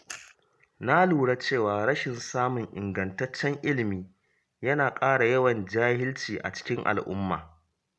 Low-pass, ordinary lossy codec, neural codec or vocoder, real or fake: none; none; none; real